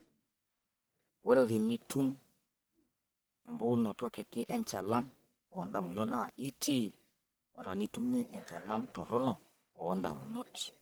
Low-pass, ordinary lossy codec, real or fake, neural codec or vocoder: none; none; fake; codec, 44.1 kHz, 1.7 kbps, Pupu-Codec